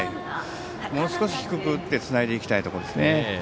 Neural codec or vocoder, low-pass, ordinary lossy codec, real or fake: none; none; none; real